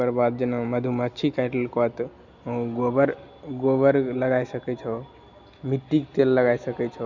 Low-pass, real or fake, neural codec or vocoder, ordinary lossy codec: 7.2 kHz; real; none; none